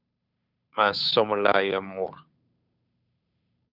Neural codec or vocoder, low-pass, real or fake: codec, 16 kHz, 2 kbps, FunCodec, trained on Chinese and English, 25 frames a second; 5.4 kHz; fake